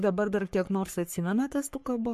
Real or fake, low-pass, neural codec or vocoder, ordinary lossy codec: fake; 14.4 kHz; codec, 44.1 kHz, 3.4 kbps, Pupu-Codec; MP3, 64 kbps